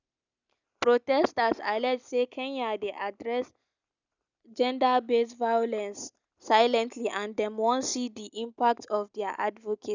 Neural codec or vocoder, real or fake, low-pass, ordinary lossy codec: none; real; 7.2 kHz; none